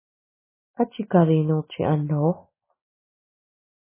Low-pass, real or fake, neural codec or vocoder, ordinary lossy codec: 3.6 kHz; real; none; MP3, 16 kbps